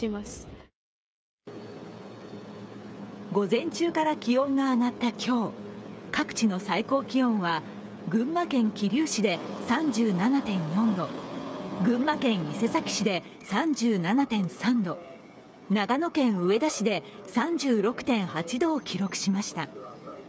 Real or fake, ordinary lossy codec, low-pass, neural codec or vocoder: fake; none; none; codec, 16 kHz, 8 kbps, FreqCodec, smaller model